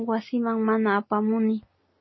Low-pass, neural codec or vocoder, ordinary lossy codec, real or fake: 7.2 kHz; none; MP3, 24 kbps; real